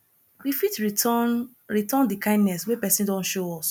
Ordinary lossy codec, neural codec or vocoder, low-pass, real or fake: none; none; none; real